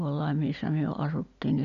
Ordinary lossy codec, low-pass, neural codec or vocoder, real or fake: none; 7.2 kHz; none; real